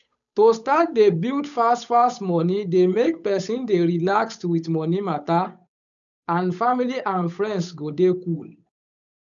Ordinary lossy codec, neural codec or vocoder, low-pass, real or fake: none; codec, 16 kHz, 8 kbps, FunCodec, trained on Chinese and English, 25 frames a second; 7.2 kHz; fake